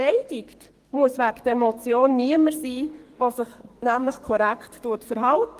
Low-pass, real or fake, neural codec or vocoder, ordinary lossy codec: 14.4 kHz; fake; codec, 44.1 kHz, 2.6 kbps, SNAC; Opus, 24 kbps